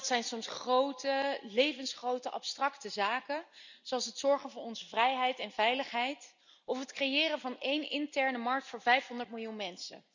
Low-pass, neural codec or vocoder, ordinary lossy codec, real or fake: 7.2 kHz; none; none; real